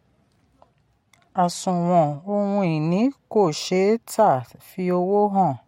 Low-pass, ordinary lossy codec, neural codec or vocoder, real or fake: 19.8 kHz; MP3, 64 kbps; none; real